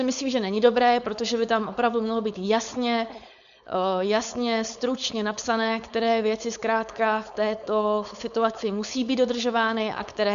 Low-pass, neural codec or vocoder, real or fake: 7.2 kHz; codec, 16 kHz, 4.8 kbps, FACodec; fake